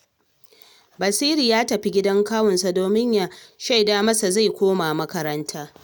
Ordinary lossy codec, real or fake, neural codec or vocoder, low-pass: none; real; none; none